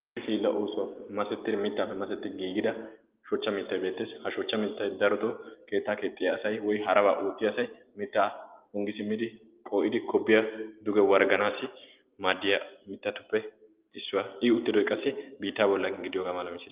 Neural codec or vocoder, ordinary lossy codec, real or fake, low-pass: none; Opus, 32 kbps; real; 3.6 kHz